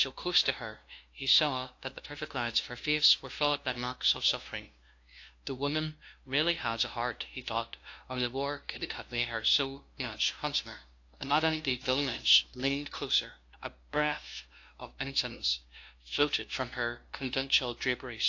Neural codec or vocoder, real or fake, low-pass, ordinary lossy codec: codec, 16 kHz, 0.5 kbps, FunCodec, trained on LibriTTS, 25 frames a second; fake; 7.2 kHz; AAC, 48 kbps